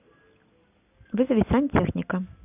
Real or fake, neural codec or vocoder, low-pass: real; none; 3.6 kHz